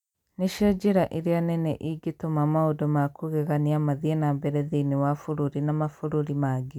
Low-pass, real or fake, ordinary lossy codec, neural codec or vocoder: 19.8 kHz; real; none; none